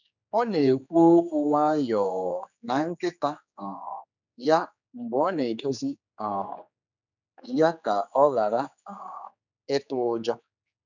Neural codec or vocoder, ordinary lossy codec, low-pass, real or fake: codec, 16 kHz, 4 kbps, X-Codec, HuBERT features, trained on general audio; none; 7.2 kHz; fake